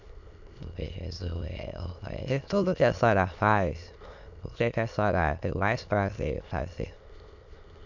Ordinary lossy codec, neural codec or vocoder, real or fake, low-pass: none; autoencoder, 22.05 kHz, a latent of 192 numbers a frame, VITS, trained on many speakers; fake; 7.2 kHz